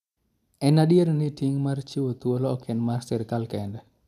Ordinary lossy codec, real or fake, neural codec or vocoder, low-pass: none; real; none; 14.4 kHz